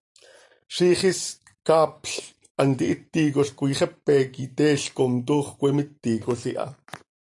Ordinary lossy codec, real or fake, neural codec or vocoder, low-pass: AAC, 64 kbps; fake; vocoder, 44.1 kHz, 128 mel bands every 256 samples, BigVGAN v2; 10.8 kHz